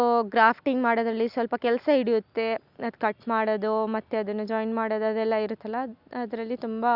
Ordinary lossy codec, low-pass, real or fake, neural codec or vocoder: Opus, 64 kbps; 5.4 kHz; real; none